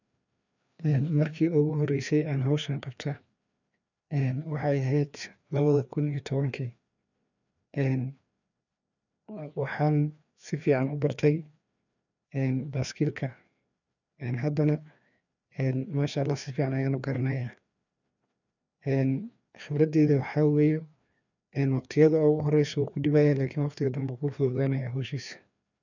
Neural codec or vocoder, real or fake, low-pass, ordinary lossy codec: codec, 16 kHz, 2 kbps, FreqCodec, larger model; fake; 7.2 kHz; none